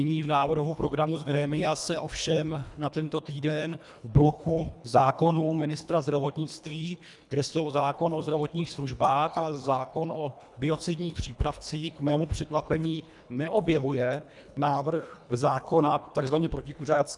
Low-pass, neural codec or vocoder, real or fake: 10.8 kHz; codec, 24 kHz, 1.5 kbps, HILCodec; fake